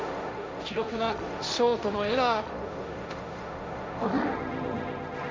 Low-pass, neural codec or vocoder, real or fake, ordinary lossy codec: none; codec, 16 kHz, 1.1 kbps, Voila-Tokenizer; fake; none